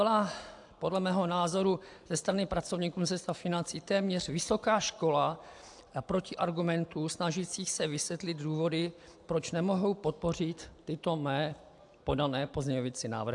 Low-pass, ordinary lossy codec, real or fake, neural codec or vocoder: 10.8 kHz; AAC, 64 kbps; real; none